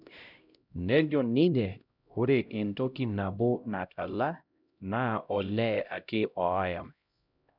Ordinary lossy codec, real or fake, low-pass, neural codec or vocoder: none; fake; 5.4 kHz; codec, 16 kHz, 0.5 kbps, X-Codec, HuBERT features, trained on LibriSpeech